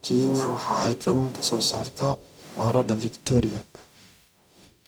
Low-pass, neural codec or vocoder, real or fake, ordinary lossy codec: none; codec, 44.1 kHz, 0.9 kbps, DAC; fake; none